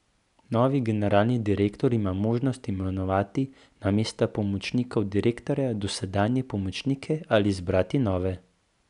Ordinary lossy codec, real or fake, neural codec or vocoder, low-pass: none; real; none; 10.8 kHz